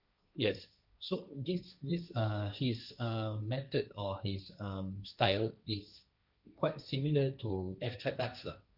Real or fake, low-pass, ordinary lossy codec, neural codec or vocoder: fake; 5.4 kHz; none; codec, 16 kHz, 1.1 kbps, Voila-Tokenizer